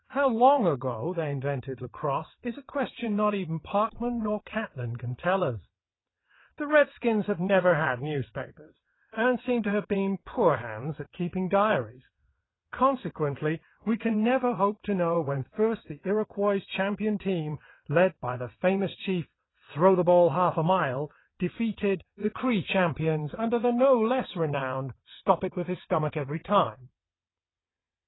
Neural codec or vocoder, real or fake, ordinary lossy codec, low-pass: vocoder, 22.05 kHz, 80 mel bands, WaveNeXt; fake; AAC, 16 kbps; 7.2 kHz